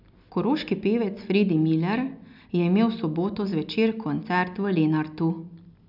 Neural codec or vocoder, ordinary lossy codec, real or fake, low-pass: none; none; real; 5.4 kHz